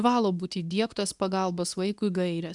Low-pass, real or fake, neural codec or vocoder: 10.8 kHz; fake; codec, 24 kHz, 0.9 kbps, WavTokenizer, medium speech release version 2